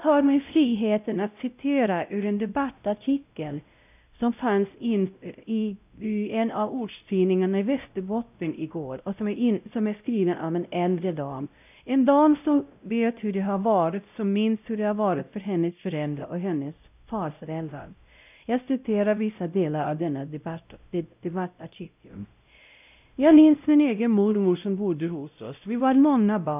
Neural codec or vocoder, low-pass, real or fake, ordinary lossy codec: codec, 16 kHz, 0.5 kbps, X-Codec, WavLM features, trained on Multilingual LibriSpeech; 3.6 kHz; fake; none